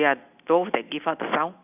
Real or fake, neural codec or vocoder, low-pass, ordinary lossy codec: real; none; 3.6 kHz; none